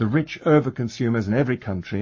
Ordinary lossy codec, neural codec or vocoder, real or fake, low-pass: MP3, 32 kbps; codec, 44.1 kHz, 7.8 kbps, Pupu-Codec; fake; 7.2 kHz